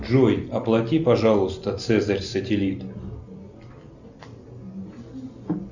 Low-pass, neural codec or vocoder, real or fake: 7.2 kHz; none; real